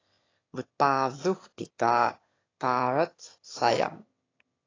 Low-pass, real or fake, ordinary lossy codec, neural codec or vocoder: 7.2 kHz; fake; AAC, 32 kbps; autoencoder, 22.05 kHz, a latent of 192 numbers a frame, VITS, trained on one speaker